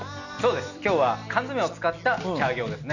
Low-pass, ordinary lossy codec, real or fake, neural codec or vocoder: 7.2 kHz; none; real; none